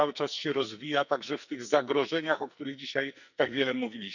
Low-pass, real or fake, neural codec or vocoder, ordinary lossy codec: 7.2 kHz; fake; codec, 44.1 kHz, 2.6 kbps, SNAC; none